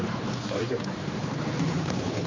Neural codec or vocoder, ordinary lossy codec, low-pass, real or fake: codec, 16 kHz, 4 kbps, X-Codec, HuBERT features, trained on general audio; MP3, 32 kbps; 7.2 kHz; fake